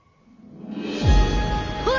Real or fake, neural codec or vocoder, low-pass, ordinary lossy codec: real; none; 7.2 kHz; none